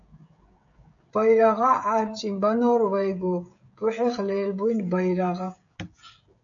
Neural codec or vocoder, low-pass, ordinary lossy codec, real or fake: codec, 16 kHz, 16 kbps, FreqCodec, smaller model; 7.2 kHz; AAC, 64 kbps; fake